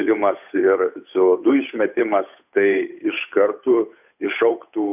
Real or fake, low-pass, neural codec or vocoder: fake; 3.6 kHz; codec, 16 kHz, 8 kbps, FunCodec, trained on Chinese and English, 25 frames a second